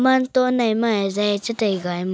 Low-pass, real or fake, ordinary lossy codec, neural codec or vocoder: none; real; none; none